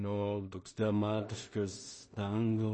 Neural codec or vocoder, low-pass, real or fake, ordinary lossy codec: codec, 16 kHz in and 24 kHz out, 0.4 kbps, LongCat-Audio-Codec, two codebook decoder; 10.8 kHz; fake; MP3, 32 kbps